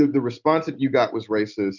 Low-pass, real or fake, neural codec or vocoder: 7.2 kHz; real; none